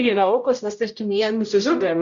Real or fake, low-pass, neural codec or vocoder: fake; 7.2 kHz; codec, 16 kHz, 0.5 kbps, X-Codec, HuBERT features, trained on general audio